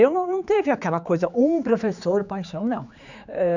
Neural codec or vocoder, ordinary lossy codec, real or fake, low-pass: codec, 16 kHz, 4 kbps, X-Codec, HuBERT features, trained on general audio; none; fake; 7.2 kHz